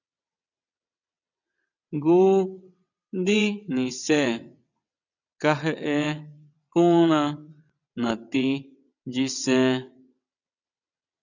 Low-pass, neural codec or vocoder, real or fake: 7.2 kHz; vocoder, 22.05 kHz, 80 mel bands, WaveNeXt; fake